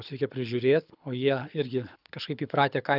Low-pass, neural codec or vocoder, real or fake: 5.4 kHz; codec, 24 kHz, 6 kbps, HILCodec; fake